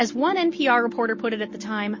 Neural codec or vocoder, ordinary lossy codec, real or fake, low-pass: none; MP3, 32 kbps; real; 7.2 kHz